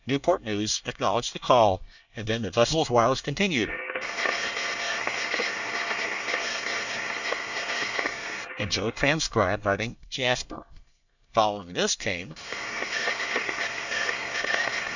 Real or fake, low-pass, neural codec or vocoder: fake; 7.2 kHz; codec, 24 kHz, 1 kbps, SNAC